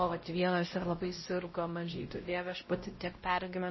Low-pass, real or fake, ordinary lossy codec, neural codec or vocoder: 7.2 kHz; fake; MP3, 24 kbps; codec, 16 kHz, 0.5 kbps, X-Codec, WavLM features, trained on Multilingual LibriSpeech